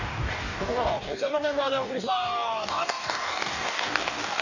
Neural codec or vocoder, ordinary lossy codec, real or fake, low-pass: codec, 44.1 kHz, 2.6 kbps, DAC; none; fake; 7.2 kHz